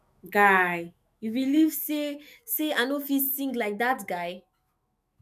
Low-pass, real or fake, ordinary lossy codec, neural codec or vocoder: 14.4 kHz; fake; none; autoencoder, 48 kHz, 128 numbers a frame, DAC-VAE, trained on Japanese speech